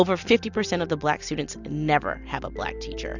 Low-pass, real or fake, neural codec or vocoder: 7.2 kHz; real; none